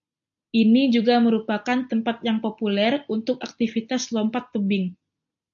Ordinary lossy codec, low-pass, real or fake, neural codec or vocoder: AAC, 64 kbps; 7.2 kHz; real; none